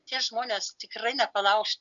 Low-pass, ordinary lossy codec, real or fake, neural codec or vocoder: 7.2 kHz; MP3, 96 kbps; real; none